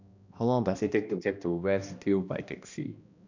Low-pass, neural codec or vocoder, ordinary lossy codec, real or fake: 7.2 kHz; codec, 16 kHz, 1 kbps, X-Codec, HuBERT features, trained on balanced general audio; none; fake